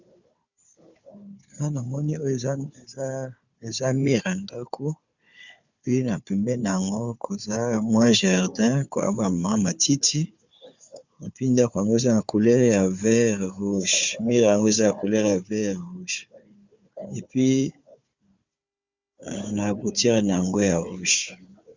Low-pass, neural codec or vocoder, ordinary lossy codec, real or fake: 7.2 kHz; codec, 16 kHz, 4 kbps, FunCodec, trained on Chinese and English, 50 frames a second; Opus, 64 kbps; fake